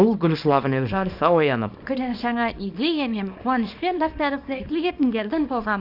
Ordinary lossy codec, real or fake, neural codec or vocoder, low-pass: none; fake; codec, 24 kHz, 0.9 kbps, WavTokenizer, small release; 5.4 kHz